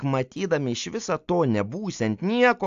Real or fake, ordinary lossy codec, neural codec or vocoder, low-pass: real; AAC, 64 kbps; none; 7.2 kHz